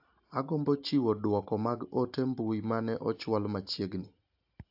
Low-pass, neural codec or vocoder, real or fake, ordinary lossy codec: 5.4 kHz; none; real; AAC, 48 kbps